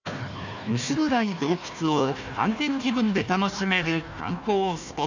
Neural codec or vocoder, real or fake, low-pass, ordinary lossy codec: codec, 16 kHz, 1 kbps, FunCodec, trained on Chinese and English, 50 frames a second; fake; 7.2 kHz; none